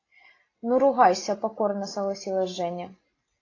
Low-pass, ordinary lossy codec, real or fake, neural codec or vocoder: 7.2 kHz; AAC, 32 kbps; real; none